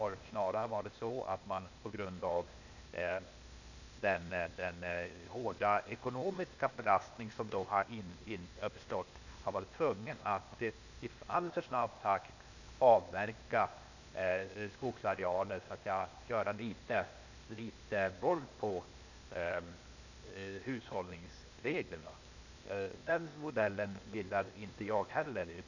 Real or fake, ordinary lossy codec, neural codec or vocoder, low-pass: fake; none; codec, 16 kHz, 0.8 kbps, ZipCodec; 7.2 kHz